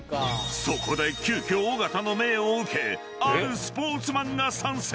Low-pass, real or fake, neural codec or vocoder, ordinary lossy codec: none; real; none; none